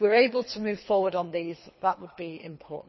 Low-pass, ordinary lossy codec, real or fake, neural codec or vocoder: 7.2 kHz; MP3, 24 kbps; fake; codec, 24 kHz, 3 kbps, HILCodec